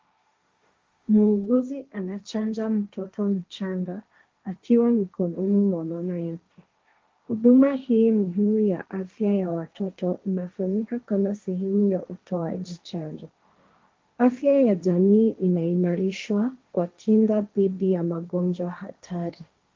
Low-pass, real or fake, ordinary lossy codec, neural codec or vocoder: 7.2 kHz; fake; Opus, 32 kbps; codec, 16 kHz, 1.1 kbps, Voila-Tokenizer